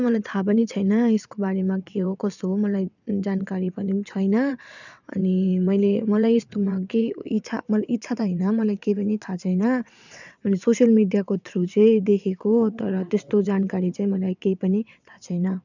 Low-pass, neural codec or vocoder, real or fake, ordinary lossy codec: 7.2 kHz; vocoder, 22.05 kHz, 80 mel bands, WaveNeXt; fake; none